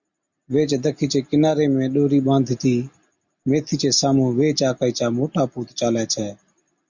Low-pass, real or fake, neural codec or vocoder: 7.2 kHz; real; none